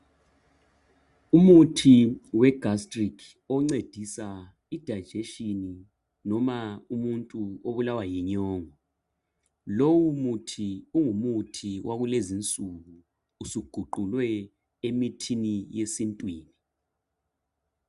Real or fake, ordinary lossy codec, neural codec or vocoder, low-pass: real; Opus, 64 kbps; none; 10.8 kHz